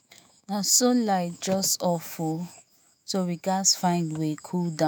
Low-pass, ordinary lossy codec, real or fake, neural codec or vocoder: none; none; fake; autoencoder, 48 kHz, 128 numbers a frame, DAC-VAE, trained on Japanese speech